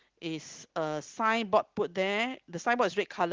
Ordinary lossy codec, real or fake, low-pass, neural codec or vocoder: Opus, 16 kbps; real; 7.2 kHz; none